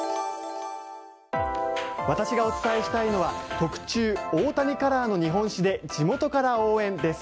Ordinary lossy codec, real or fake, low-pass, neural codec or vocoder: none; real; none; none